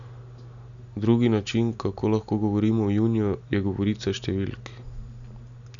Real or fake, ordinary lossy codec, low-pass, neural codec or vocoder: real; none; 7.2 kHz; none